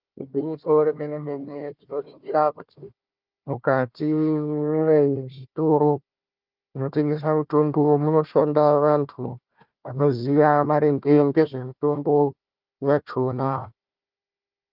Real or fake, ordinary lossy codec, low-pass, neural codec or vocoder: fake; Opus, 24 kbps; 5.4 kHz; codec, 16 kHz, 1 kbps, FunCodec, trained on Chinese and English, 50 frames a second